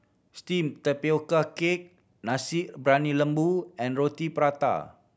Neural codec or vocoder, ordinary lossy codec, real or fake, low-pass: none; none; real; none